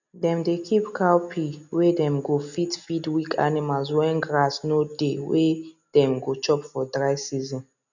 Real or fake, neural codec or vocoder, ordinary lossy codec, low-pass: real; none; none; 7.2 kHz